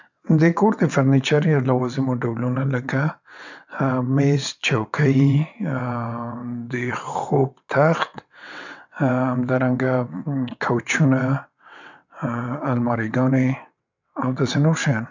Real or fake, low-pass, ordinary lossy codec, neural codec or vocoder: fake; 7.2 kHz; none; vocoder, 22.05 kHz, 80 mel bands, WaveNeXt